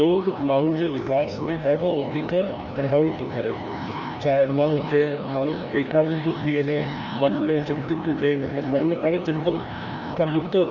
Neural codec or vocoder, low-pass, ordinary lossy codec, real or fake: codec, 16 kHz, 1 kbps, FreqCodec, larger model; 7.2 kHz; none; fake